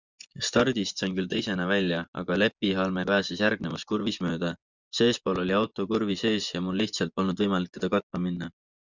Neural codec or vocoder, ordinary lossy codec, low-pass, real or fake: vocoder, 24 kHz, 100 mel bands, Vocos; Opus, 64 kbps; 7.2 kHz; fake